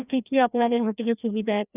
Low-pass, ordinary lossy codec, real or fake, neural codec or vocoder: 3.6 kHz; none; fake; codec, 16 kHz, 1 kbps, FreqCodec, larger model